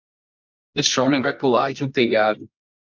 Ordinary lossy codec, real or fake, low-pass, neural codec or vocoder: AAC, 48 kbps; fake; 7.2 kHz; codec, 24 kHz, 0.9 kbps, WavTokenizer, medium music audio release